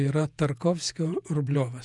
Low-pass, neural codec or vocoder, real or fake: 10.8 kHz; vocoder, 48 kHz, 128 mel bands, Vocos; fake